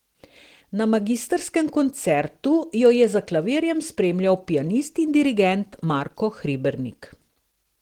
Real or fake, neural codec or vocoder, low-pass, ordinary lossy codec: real; none; 19.8 kHz; Opus, 16 kbps